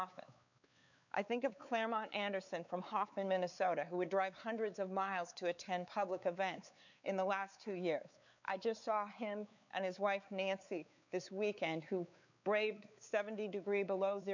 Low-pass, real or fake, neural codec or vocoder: 7.2 kHz; fake; codec, 16 kHz, 4 kbps, X-Codec, WavLM features, trained on Multilingual LibriSpeech